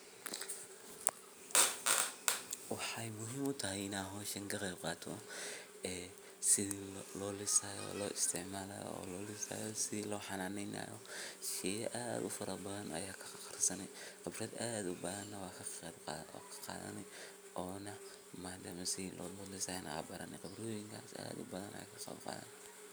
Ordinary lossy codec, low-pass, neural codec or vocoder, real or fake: none; none; none; real